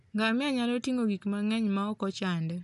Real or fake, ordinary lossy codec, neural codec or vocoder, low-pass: real; none; none; 10.8 kHz